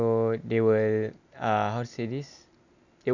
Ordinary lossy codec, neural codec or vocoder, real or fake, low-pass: none; none; real; 7.2 kHz